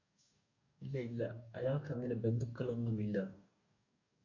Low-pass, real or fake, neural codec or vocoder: 7.2 kHz; fake; codec, 44.1 kHz, 2.6 kbps, DAC